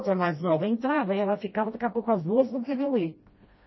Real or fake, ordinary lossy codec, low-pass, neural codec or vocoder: fake; MP3, 24 kbps; 7.2 kHz; codec, 16 kHz, 1 kbps, FreqCodec, smaller model